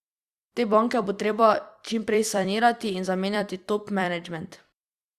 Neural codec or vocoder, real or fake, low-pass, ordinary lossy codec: vocoder, 44.1 kHz, 128 mel bands every 256 samples, BigVGAN v2; fake; 14.4 kHz; Opus, 64 kbps